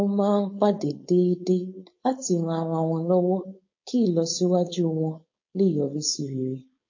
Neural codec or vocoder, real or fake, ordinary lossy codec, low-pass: codec, 16 kHz, 4.8 kbps, FACodec; fake; MP3, 32 kbps; 7.2 kHz